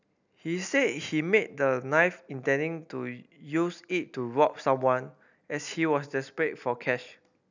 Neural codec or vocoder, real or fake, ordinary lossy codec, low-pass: none; real; none; 7.2 kHz